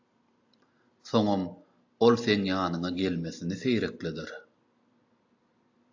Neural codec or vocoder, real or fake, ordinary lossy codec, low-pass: none; real; AAC, 48 kbps; 7.2 kHz